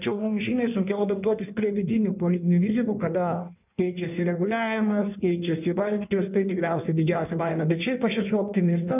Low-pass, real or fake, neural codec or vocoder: 3.6 kHz; fake; codec, 16 kHz in and 24 kHz out, 1.1 kbps, FireRedTTS-2 codec